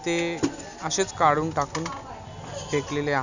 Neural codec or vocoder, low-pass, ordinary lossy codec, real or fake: none; 7.2 kHz; none; real